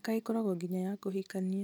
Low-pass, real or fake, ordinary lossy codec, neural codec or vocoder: none; real; none; none